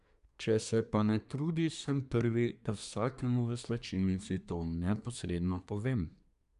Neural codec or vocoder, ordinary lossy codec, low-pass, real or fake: codec, 24 kHz, 1 kbps, SNAC; none; 10.8 kHz; fake